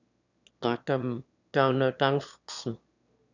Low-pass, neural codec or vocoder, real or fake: 7.2 kHz; autoencoder, 22.05 kHz, a latent of 192 numbers a frame, VITS, trained on one speaker; fake